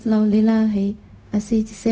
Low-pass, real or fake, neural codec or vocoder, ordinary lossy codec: none; fake; codec, 16 kHz, 0.4 kbps, LongCat-Audio-Codec; none